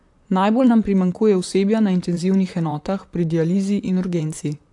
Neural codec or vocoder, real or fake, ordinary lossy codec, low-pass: vocoder, 44.1 kHz, 128 mel bands, Pupu-Vocoder; fake; AAC, 64 kbps; 10.8 kHz